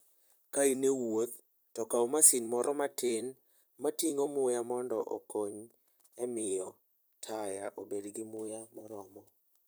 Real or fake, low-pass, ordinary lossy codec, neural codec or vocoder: fake; none; none; vocoder, 44.1 kHz, 128 mel bands, Pupu-Vocoder